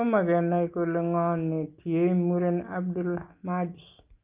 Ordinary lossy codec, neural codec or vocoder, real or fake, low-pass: Opus, 24 kbps; none; real; 3.6 kHz